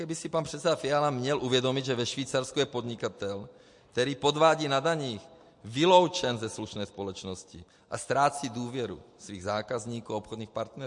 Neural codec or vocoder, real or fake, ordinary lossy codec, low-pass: none; real; MP3, 48 kbps; 10.8 kHz